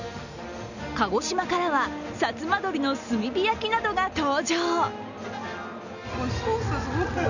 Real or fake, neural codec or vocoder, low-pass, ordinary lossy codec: real; none; 7.2 kHz; none